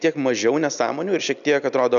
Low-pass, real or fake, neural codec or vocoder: 7.2 kHz; real; none